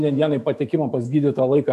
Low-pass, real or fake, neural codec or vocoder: 14.4 kHz; fake; autoencoder, 48 kHz, 128 numbers a frame, DAC-VAE, trained on Japanese speech